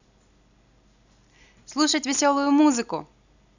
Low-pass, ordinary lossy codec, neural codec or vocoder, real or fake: 7.2 kHz; none; none; real